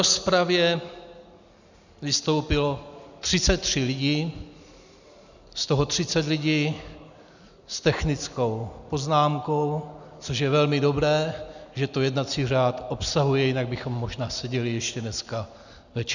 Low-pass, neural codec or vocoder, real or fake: 7.2 kHz; none; real